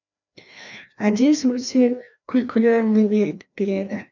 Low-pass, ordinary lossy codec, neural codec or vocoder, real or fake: 7.2 kHz; none; codec, 16 kHz, 1 kbps, FreqCodec, larger model; fake